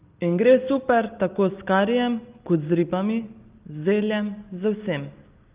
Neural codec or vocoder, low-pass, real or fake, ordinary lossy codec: none; 3.6 kHz; real; Opus, 32 kbps